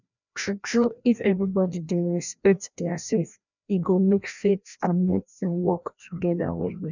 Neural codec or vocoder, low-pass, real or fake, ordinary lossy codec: codec, 16 kHz, 1 kbps, FreqCodec, larger model; 7.2 kHz; fake; none